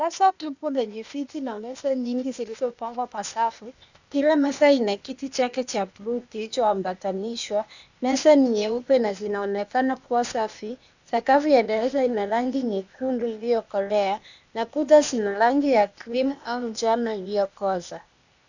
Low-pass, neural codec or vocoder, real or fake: 7.2 kHz; codec, 16 kHz, 0.8 kbps, ZipCodec; fake